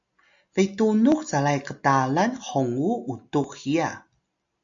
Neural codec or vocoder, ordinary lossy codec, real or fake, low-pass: none; AAC, 64 kbps; real; 7.2 kHz